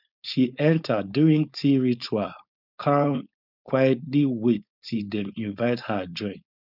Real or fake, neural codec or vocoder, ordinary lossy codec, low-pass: fake; codec, 16 kHz, 4.8 kbps, FACodec; none; 5.4 kHz